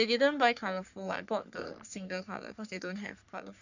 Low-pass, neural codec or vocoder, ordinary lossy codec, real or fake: 7.2 kHz; codec, 44.1 kHz, 3.4 kbps, Pupu-Codec; none; fake